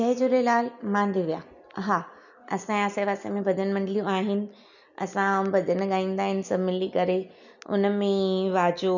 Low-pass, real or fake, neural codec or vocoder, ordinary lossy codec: 7.2 kHz; real; none; none